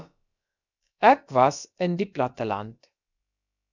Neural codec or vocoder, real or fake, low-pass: codec, 16 kHz, about 1 kbps, DyCAST, with the encoder's durations; fake; 7.2 kHz